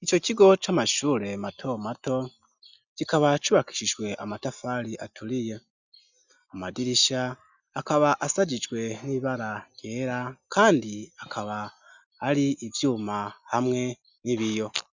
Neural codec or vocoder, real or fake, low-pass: none; real; 7.2 kHz